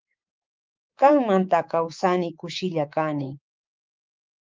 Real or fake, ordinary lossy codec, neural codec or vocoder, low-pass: fake; Opus, 32 kbps; codec, 24 kHz, 3.1 kbps, DualCodec; 7.2 kHz